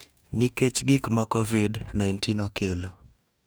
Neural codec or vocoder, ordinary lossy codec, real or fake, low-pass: codec, 44.1 kHz, 2.6 kbps, DAC; none; fake; none